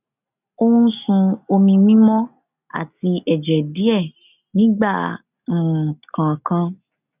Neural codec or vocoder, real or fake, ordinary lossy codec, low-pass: none; real; none; 3.6 kHz